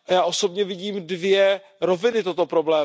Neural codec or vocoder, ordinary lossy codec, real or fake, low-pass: none; none; real; none